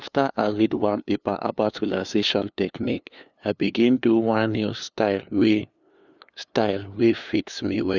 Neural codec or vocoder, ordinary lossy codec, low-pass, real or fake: codec, 16 kHz, 2 kbps, FunCodec, trained on LibriTTS, 25 frames a second; none; 7.2 kHz; fake